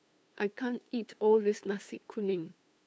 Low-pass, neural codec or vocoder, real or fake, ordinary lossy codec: none; codec, 16 kHz, 2 kbps, FunCodec, trained on LibriTTS, 25 frames a second; fake; none